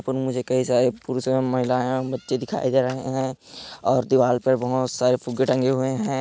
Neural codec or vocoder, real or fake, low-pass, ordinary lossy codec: none; real; none; none